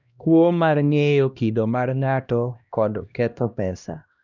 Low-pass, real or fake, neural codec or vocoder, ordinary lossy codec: 7.2 kHz; fake; codec, 16 kHz, 1 kbps, X-Codec, HuBERT features, trained on LibriSpeech; none